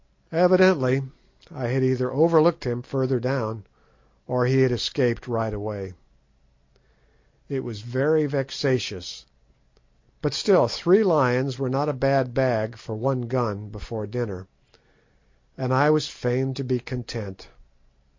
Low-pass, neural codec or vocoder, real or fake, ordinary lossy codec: 7.2 kHz; none; real; MP3, 48 kbps